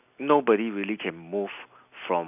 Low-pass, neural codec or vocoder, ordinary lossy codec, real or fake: 3.6 kHz; none; none; real